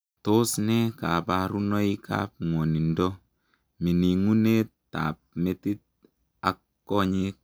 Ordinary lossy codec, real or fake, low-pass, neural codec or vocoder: none; real; none; none